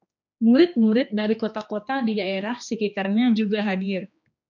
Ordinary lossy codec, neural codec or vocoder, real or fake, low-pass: MP3, 64 kbps; codec, 16 kHz, 2 kbps, X-Codec, HuBERT features, trained on general audio; fake; 7.2 kHz